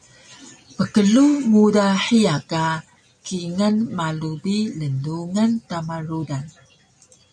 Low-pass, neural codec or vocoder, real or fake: 9.9 kHz; none; real